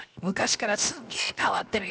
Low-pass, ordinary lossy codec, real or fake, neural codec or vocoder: none; none; fake; codec, 16 kHz, 0.7 kbps, FocalCodec